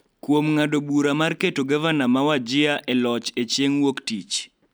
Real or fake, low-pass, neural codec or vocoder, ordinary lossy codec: real; none; none; none